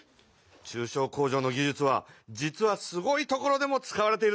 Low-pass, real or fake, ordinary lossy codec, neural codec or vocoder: none; real; none; none